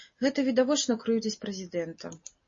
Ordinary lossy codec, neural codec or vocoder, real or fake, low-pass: MP3, 32 kbps; none; real; 7.2 kHz